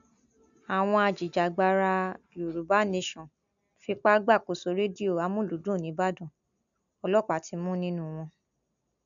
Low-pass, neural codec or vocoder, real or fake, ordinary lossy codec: 7.2 kHz; none; real; none